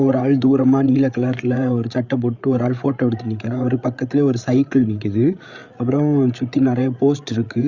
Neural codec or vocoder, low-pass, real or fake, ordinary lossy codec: codec, 16 kHz, 8 kbps, FreqCodec, larger model; 7.2 kHz; fake; Opus, 64 kbps